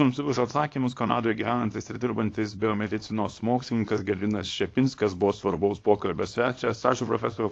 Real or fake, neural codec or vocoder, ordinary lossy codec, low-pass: fake; codec, 24 kHz, 0.9 kbps, WavTokenizer, small release; AAC, 48 kbps; 9.9 kHz